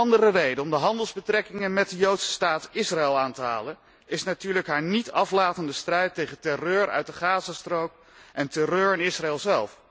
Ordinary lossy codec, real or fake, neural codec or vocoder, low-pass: none; real; none; none